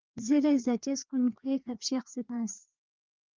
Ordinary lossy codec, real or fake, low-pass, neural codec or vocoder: Opus, 24 kbps; fake; 7.2 kHz; codec, 16 kHz, 2 kbps, FreqCodec, larger model